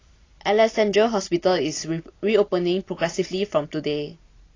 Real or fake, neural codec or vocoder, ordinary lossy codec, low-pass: real; none; AAC, 32 kbps; 7.2 kHz